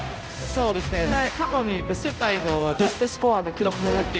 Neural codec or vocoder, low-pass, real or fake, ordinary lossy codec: codec, 16 kHz, 0.5 kbps, X-Codec, HuBERT features, trained on balanced general audio; none; fake; none